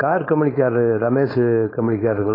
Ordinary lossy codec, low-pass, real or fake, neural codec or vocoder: AAC, 24 kbps; 5.4 kHz; fake; codec, 16 kHz, 4.8 kbps, FACodec